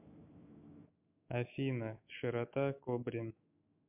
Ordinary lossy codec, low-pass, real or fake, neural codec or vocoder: MP3, 32 kbps; 3.6 kHz; fake; autoencoder, 48 kHz, 128 numbers a frame, DAC-VAE, trained on Japanese speech